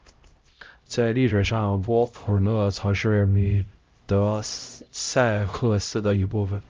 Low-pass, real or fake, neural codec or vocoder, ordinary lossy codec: 7.2 kHz; fake; codec, 16 kHz, 0.5 kbps, X-Codec, HuBERT features, trained on LibriSpeech; Opus, 32 kbps